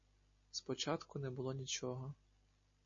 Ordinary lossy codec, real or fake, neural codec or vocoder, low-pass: MP3, 32 kbps; real; none; 7.2 kHz